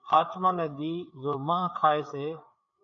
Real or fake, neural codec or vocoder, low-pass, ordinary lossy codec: fake; codec, 16 kHz, 4 kbps, FreqCodec, larger model; 7.2 kHz; MP3, 48 kbps